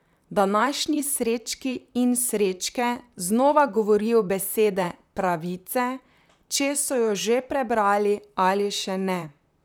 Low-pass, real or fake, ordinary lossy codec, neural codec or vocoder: none; fake; none; vocoder, 44.1 kHz, 128 mel bands, Pupu-Vocoder